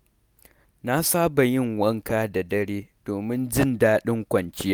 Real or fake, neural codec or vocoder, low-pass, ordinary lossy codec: real; none; none; none